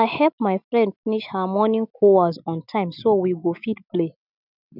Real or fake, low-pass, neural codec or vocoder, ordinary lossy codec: real; 5.4 kHz; none; none